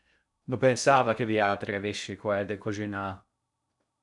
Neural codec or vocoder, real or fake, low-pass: codec, 16 kHz in and 24 kHz out, 0.6 kbps, FocalCodec, streaming, 2048 codes; fake; 10.8 kHz